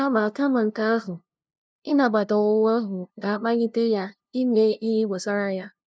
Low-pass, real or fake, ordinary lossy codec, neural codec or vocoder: none; fake; none; codec, 16 kHz, 0.5 kbps, FunCodec, trained on LibriTTS, 25 frames a second